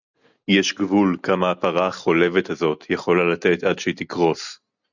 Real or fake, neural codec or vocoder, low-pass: real; none; 7.2 kHz